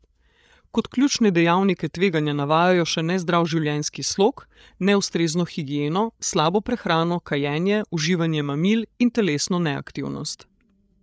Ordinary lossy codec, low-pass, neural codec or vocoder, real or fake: none; none; codec, 16 kHz, 8 kbps, FreqCodec, larger model; fake